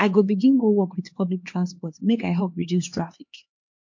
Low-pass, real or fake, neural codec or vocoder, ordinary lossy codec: 7.2 kHz; fake; codec, 16 kHz, 2 kbps, X-Codec, HuBERT features, trained on LibriSpeech; MP3, 48 kbps